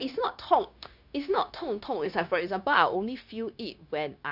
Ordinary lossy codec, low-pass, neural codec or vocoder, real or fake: none; 5.4 kHz; codec, 16 kHz, 0.9 kbps, LongCat-Audio-Codec; fake